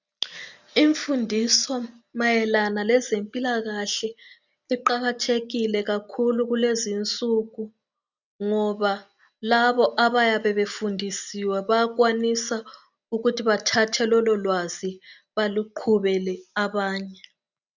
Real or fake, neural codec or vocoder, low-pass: real; none; 7.2 kHz